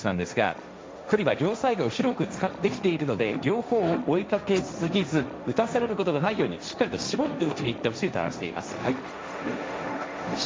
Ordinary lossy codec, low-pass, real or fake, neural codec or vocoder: none; none; fake; codec, 16 kHz, 1.1 kbps, Voila-Tokenizer